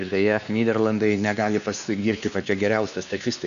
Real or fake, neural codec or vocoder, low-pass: fake; codec, 16 kHz, 2 kbps, X-Codec, WavLM features, trained on Multilingual LibriSpeech; 7.2 kHz